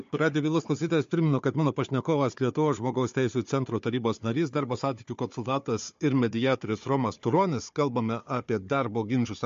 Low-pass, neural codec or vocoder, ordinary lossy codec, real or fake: 7.2 kHz; codec, 16 kHz, 4 kbps, FunCodec, trained on Chinese and English, 50 frames a second; MP3, 48 kbps; fake